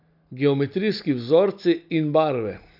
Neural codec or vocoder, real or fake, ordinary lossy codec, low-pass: none; real; none; 5.4 kHz